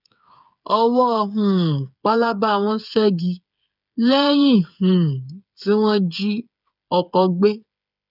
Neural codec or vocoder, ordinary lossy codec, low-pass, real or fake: codec, 16 kHz, 8 kbps, FreqCodec, smaller model; none; 5.4 kHz; fake